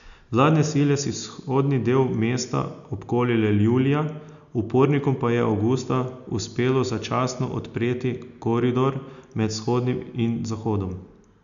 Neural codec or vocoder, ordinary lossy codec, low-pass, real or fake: none; none; 7.2 kHz; real